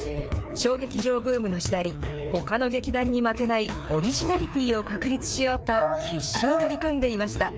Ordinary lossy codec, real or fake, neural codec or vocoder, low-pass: none; fake; codec, 16 kHz, 2 kbps, FreqCodec, larger model; none